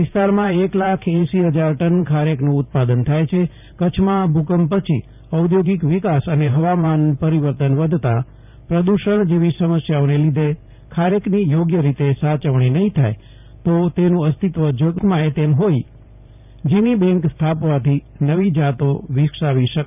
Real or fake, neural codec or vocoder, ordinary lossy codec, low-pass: fake; vocoder, 44.1 kHz, 128 mel bands every 512 samples, BigVGAN v2; none; 3.6 kHz